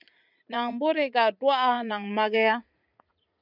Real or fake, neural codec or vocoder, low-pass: fake; vocoder, 44.1 kHz, 80 mel bands, Vocos; 5.4 kHz